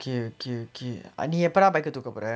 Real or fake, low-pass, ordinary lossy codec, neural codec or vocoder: real; none; none; none